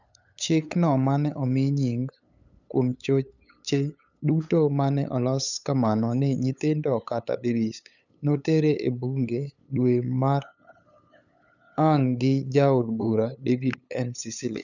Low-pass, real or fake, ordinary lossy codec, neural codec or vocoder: 7.2 kHz; fake; none; codec, 16 kHz, 8 kbps, FunCodec, trained on LibriTTS, 25 frames a second